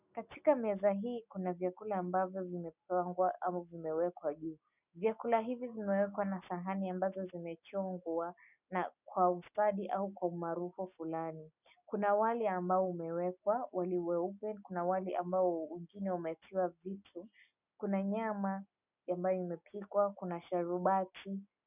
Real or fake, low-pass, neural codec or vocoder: real; 3.6 kHz; none